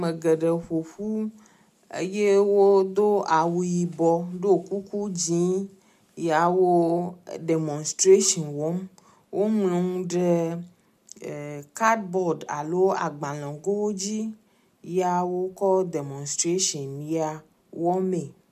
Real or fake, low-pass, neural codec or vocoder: real; 14.4 kHz; none